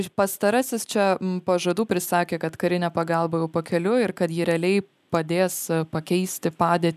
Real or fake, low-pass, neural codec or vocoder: real; 14.4 kHz; none